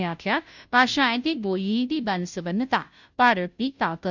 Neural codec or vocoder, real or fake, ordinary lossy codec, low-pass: codec, 16 kHz, 0.5 kbps, FunCodec, trained on Chinese and English, 25 frames a second; fake; none; 7.2 kHz